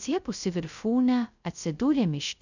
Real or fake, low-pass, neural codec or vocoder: fake; 7.2 kHz; codec, 16 kHz, 0.2 kbps, FocalCodec